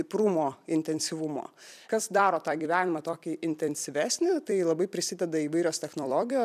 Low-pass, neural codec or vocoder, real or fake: 14.4 kHz; vocoder, 44.1 kHz, 128 mel bands every 256 samples, BigVGAN v2; fake